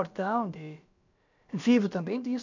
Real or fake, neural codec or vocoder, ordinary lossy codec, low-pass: fake; codec, 16 kHz, about 1 kbps, DyCAST, with the encoder's durations; none; 7.2 kHz